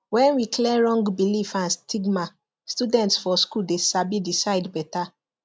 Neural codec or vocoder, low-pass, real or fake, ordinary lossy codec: none; none; real; none